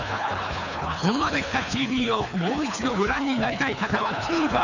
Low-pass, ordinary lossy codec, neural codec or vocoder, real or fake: 7.2 kHz; none; codec, 24 kHz, 3 kbps, HILCodec; fake